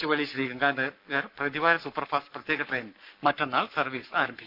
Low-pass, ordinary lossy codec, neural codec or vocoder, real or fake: 5.4 kHz; none; codec, 44.1 kHz, 7.8 kbps, Pupu-Codec; fake